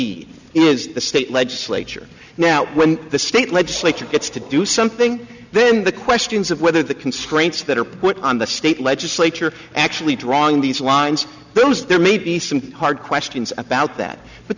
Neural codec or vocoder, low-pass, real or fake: none; 7.2 kHz; real